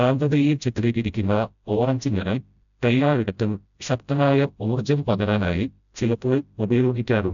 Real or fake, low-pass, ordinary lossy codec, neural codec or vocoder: fake; 7.2 kHz; none; codec, 16 kHz, 0.5 kbps, FreqCodec, smaller model